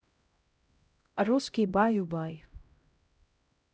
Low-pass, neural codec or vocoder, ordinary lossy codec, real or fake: none; codec, 16 kHz, 0.5 kbps, X-Codec, HuBERT features, trained on LibriSpeech; none; fake